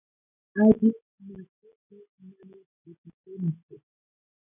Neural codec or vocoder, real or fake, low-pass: none; real; 3.6 kHz